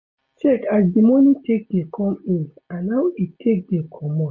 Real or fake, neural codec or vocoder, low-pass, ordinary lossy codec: real; none; 7.2 kHz; MP3, 32 kbps